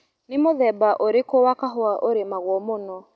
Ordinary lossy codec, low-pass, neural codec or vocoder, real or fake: none; none; none; real